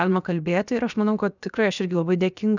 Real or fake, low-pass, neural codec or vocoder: fake; 7.2 kHz; codec, 16 kHz, 0.7 kbps, FocalCodec